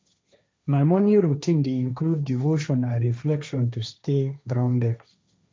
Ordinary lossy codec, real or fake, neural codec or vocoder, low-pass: none; fake; codec, 16 kHz, 1.1 kbps, Voila-Tokenizer; none